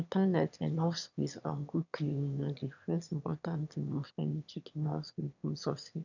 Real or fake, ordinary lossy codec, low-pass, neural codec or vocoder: fake; none; 7.2 kHz; autoencoder, 22.05 kHz, a latent of 192 numbers a frame, VITS, trained on one speaker